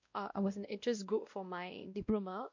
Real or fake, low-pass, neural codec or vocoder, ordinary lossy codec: fake; 7.2 kHz; codec, 16 kHz, 1 kbps, X-Codec, WavLM features, trained on Multilingual LibriSpeech; MP3, 48 kbps